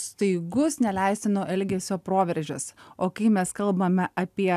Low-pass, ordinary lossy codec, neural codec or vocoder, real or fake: 14.4 kHz; AAC, 96 kbps; none; real